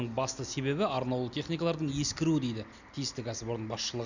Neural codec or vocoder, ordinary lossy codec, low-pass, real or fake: none; none; 7.2 kHz; real